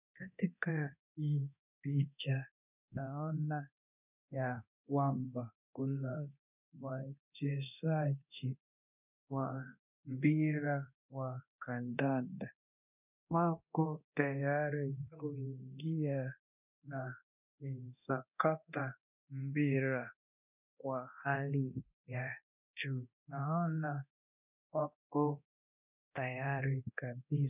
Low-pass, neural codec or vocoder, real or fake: 3.6 kHz; codec, 24 kHz, 0.9 kbps, DualCodec; fake